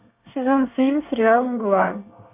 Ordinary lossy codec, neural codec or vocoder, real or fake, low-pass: MP3, 32 kbps; codec, 24 kHz, 1 kbps, SNAC; fake; 3.6 kHz